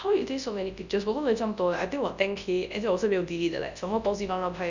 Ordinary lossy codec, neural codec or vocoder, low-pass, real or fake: none; codec, 24 kHz, 0.9 kbps, WavTokenizer, large speech release; 7.2 kHz; fake